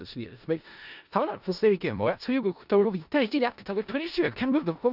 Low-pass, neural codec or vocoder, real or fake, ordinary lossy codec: 5.4 kHz; codec, 16 kHz in and 24 kHz out, 0.4 kbps, LongCat-Audio-Codec, four codebook decoder; fake; none